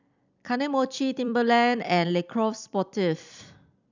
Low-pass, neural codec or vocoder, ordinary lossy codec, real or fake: 7.2 kHz; vocoder, 44.1 kHz, 128 mel bands every 256 samples, BigVGAN v2; none; fake